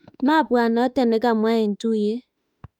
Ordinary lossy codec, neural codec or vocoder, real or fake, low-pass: none; autoencoder, 48 kHz, 32 numbers a frame, DAC-VAE, trained on Japanese speech; fake; 19.8 kHz